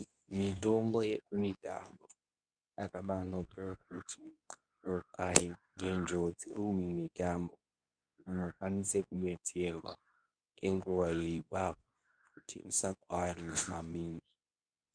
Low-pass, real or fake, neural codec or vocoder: 9.9 kHz; fake; codec, 24 kHz, 0.9 kbps, WavTokenizer, medium speech release version 2